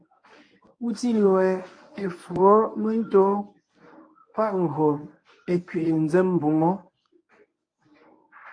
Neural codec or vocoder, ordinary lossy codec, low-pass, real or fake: codec, 24 kHz, 0.9 kbps, WavTokenizer, medium speech release version 1; AAC, 48 kbps; 9.9 kHz; fake